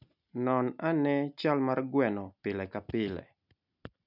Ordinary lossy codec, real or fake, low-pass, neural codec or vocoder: none; real; 5.4 kHz; none